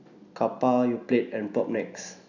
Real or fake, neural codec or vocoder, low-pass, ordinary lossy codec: real; none; 7.2 kHz; none